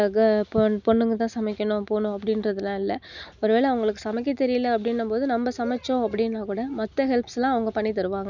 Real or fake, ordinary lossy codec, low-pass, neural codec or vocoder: real; none; 7.2 kHz; none